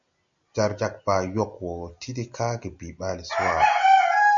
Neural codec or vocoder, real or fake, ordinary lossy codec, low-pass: none; real; MP3, 64 kbps; 7.2 kHz